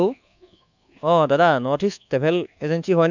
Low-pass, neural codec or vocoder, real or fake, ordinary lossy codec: 7.2 kHz; codec, 24 kHz, 1.2 kbps, DualCodec; fake; none